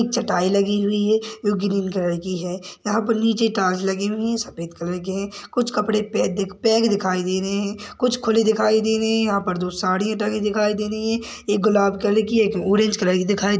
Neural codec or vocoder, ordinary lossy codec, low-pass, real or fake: none; none; none; real